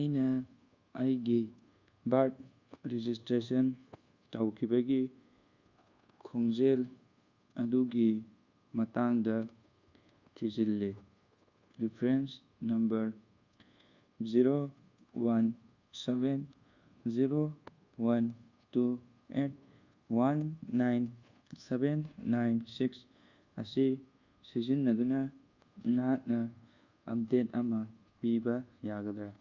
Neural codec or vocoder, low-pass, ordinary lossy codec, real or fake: codec, 24 kHz, 1.2 kbps, DualCodec; 7.2 kHz; Opus, 64 kbps; fake